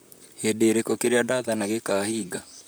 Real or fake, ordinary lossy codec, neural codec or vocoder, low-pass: fake; none; vocoder, 44.1 kHz, 128 mel bands, Pupu-Vocoder; none